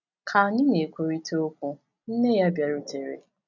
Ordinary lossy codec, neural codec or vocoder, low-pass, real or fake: none; none; 7.2 kHz; real